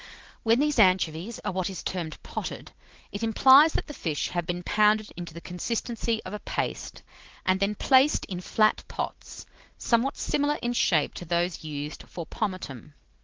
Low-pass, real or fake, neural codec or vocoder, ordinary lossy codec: 7.2 kHz; real; none; Opus, 16 kbps